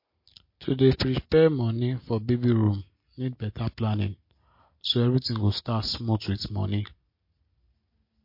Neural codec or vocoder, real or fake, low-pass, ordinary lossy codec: none; real; 5.4 kHz; MP3, 32 kbps